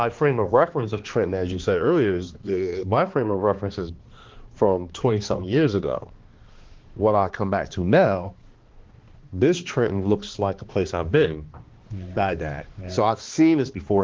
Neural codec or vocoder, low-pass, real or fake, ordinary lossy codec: codec, 16 kHz, 2 kbps, X-Codec, HuBERT features, trained on balanced general audio; 7.2 kHz; fake; Opus, 16 kbps